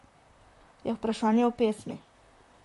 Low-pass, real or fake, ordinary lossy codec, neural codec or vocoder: 14.4 kHz; fake; MP3, 48 kbps; vocoder, 44.1 kHz, 128 mel bands, Pupu-Vocoder